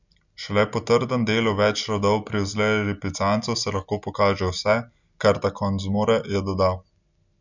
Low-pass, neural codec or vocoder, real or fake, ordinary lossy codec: 7.2 kHz; none; real; none